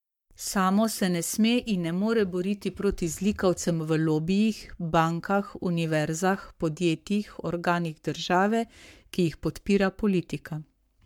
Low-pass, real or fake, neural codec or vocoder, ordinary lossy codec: 19.8 kHz; fake; codec, 44.1 kHz, 7.8 kbps, Pupu-Codec; MP3, 96 kbps